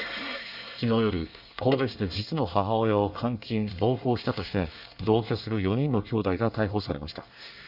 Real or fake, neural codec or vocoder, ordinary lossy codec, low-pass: fake; codec, 24 kHz, 1 kbps, SNAC; none; 5.4 kHz